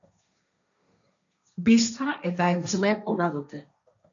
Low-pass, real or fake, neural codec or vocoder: 7.2 kHz; fake; codec, 16 kHz, 1.1 kbps, Voila-Tokenizer